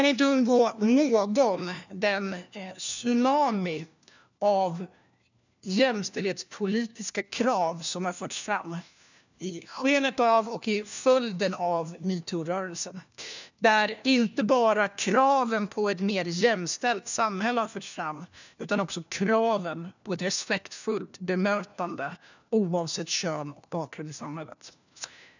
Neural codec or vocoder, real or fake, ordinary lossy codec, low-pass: codec, 16 kHz, 1 kbps, FunCodec, trained on LibriTTS, 50 frames a second; fake; none; 7.2 kHz